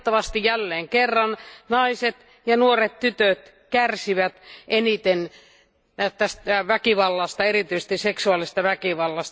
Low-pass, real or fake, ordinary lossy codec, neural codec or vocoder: none; real; none; none